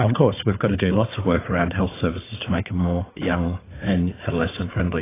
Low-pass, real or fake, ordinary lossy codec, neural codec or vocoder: 3.6 kHz; fake; AAC, 16 kbps; codec, 16 kHz, 4 kbps, FunCodec, trained on Chinese and English, 50 frames a second